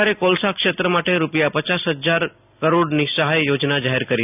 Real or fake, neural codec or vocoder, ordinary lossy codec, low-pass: real; none; none; 3.6 kHz